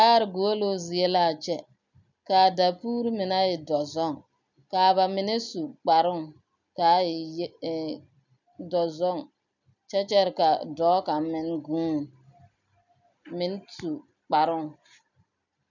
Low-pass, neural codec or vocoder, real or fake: 7.2 kHz; none; real